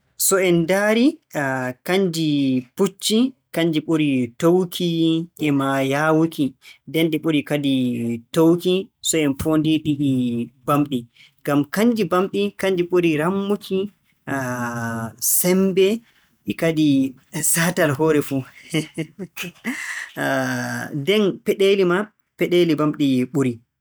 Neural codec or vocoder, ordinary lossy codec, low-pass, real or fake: none; none; none; real